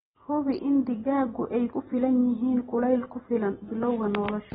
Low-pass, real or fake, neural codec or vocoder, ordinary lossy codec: 7.2 kHz; real; none; AAC, 16 kbps